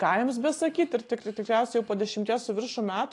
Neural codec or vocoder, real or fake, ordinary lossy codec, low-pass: none; real; AAC, 48 kbps; 10.8 kHz